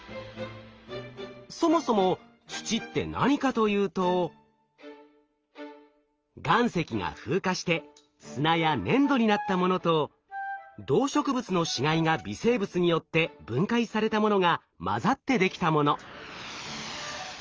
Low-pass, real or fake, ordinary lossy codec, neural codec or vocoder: 7.2 kHz; real; Opus, 24 kbps; none